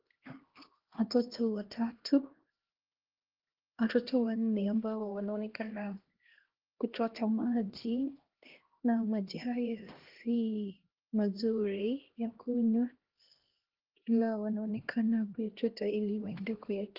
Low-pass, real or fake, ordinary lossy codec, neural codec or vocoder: 5.4 kHz; fake; Opus, 16 kbps; codec, 16 kHz, 1 kbps, X-Codec, HuBERT features, trained on LibriSpeech